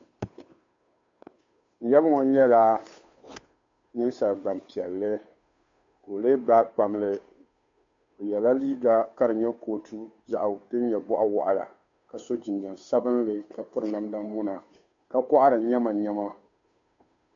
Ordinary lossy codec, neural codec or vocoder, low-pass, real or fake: AAC, 64 kbps; codec, 16 kHz, 2 kbps, FunCodec, trained on Chinese and English, 25 frames a second; 7.2 kHz; fake